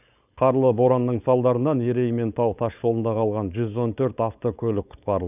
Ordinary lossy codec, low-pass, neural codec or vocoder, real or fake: none; 3.6 kHz; codec, 16 kHz, 4.8 kbps, FACodec; fake